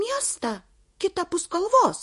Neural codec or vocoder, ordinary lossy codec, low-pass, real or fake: vocoder, 48 kHz, 128 mel bands, Vocos; MP3, 48 kbps; 14.4 kHz; fake